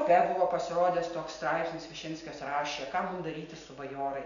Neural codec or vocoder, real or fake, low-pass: none; real; 7.2 kHz